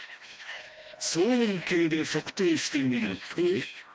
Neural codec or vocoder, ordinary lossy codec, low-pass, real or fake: codec, 16 kHz, 1 kbps, FreqCodec, smaller model; none; none; fake